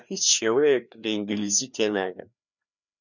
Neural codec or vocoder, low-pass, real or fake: codec, 16 kHz, 2 kbps, FreqCodec, larger model; 7.2 kHz; fake